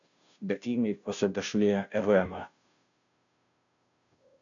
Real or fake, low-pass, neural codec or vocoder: fake; 7.2 kHz; codec, 16 kHz, 0.5 kbps, FunCodec, trained on Chinese and English, 25 frames a second